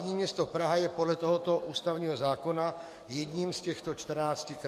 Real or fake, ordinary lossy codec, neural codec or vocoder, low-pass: fake; MP3, 64 kbps; codec, 44.1 kHz, 7.8 kbps, DAC; 14.4 kHz